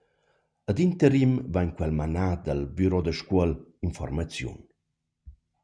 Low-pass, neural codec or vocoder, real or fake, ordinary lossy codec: 9.9 kHz; none; real; MP3, 96 kbps